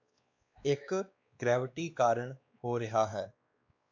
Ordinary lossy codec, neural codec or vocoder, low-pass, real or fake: AAC, 48 kbps; codec, 16 kHz, 2 kbps, X-Codec, WavLM features, trained on Multilingual LibriSpeech; 7.2 kHz; fake